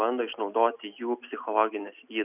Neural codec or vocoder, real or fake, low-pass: none; real; 3.6 kHz